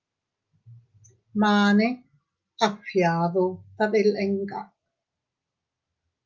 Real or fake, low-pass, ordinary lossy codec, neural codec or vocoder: real; 7.2 kHz; Opus, 24 kbps; none